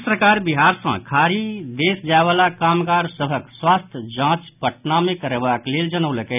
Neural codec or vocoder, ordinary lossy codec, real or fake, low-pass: none; none; real; 3.6 kHz